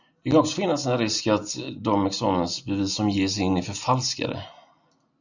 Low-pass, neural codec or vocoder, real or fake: 7.2 kHz; none; real